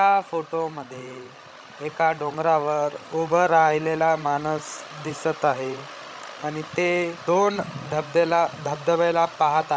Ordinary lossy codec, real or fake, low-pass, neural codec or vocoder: none; fake; none; codec, 16 kHz, 16 kbps, FreqCodec, larger model